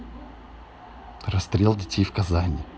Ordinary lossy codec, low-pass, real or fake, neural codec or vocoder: none; none; real; none